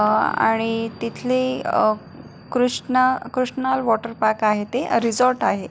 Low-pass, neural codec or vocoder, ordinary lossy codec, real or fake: none; none; none; real